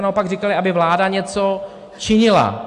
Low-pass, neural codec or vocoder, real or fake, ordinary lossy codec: 10.8 kHz; none; real; AAC, 64 kbps